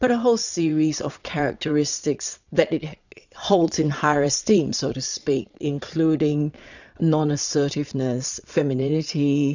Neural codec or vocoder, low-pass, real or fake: vocoder, 22.05 kHz, 80 mel bands, Vocos; 7.2 kHz; fake